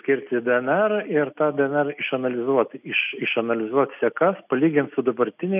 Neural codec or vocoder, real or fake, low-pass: none; real; 3.6 kHz